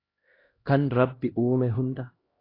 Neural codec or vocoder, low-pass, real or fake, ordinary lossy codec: codec, 16 kHz, 1 kbps, X-Codec, HuBERT features, trained on LibriSpeech; 5.4 kHz; fake; AAC, 24 kbps